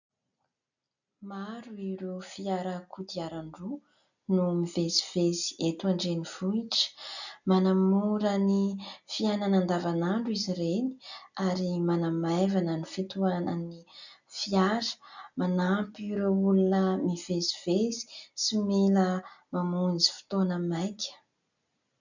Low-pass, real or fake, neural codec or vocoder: 7.2 kHz; real; none